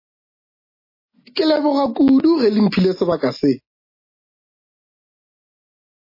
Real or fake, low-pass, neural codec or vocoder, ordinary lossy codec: real; 5.4 kHz; none; MP3, 24 kbps